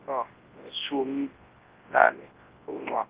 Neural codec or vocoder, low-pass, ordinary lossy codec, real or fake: codec, 24 kHz, 0.9 kbps, WavTokenizer, large speech release; 3.6 kHz; Opus, 16 kbps; fake